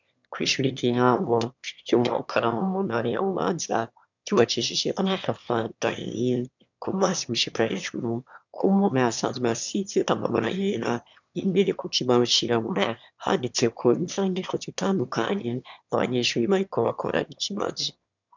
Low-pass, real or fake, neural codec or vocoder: 7.2 kHz; fake; autoencoder, 22.05 kHz, a latent of 192 numbers a frame, VITS, trained on one speaker